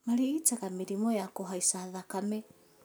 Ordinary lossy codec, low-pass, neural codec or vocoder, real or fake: none; none; none; real